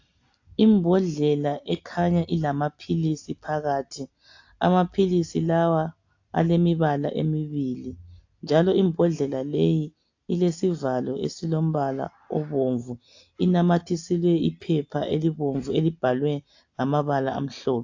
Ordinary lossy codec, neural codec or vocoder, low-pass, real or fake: AAC, 48 kbps; none; 7.2 kHz; real